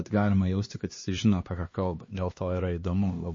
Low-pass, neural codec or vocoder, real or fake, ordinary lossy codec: 7.2 kHz; codec, 16 kHz, 2 kbps, X-Codec, HuBERT features, trained on LibriSpeech; fake; MP3, 32 kbps